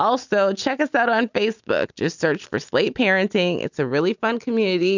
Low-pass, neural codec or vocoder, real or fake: 7.2 kHz; none; real